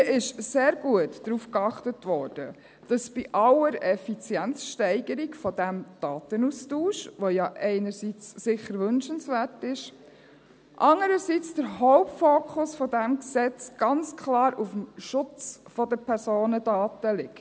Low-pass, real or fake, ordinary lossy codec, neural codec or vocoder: none; real; none; none